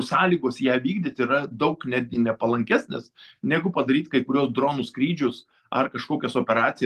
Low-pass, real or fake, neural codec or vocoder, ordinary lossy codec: 14.4 kHz; real; none; Opus, 24 kbps